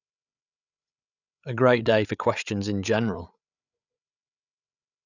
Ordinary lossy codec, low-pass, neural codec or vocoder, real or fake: none; 7.2 kHz; codec, 16 kHz, 8 kbps, FreqCodec, larger model; fake